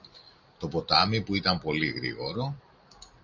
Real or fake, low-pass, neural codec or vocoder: real; 7.2 kHz; none